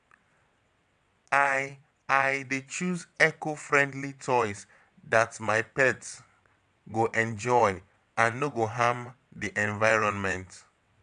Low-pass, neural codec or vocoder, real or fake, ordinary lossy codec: 9.9 kHz; vocoder, 22.05 kHz, 80 mel bands, WaveNeXt; fake; none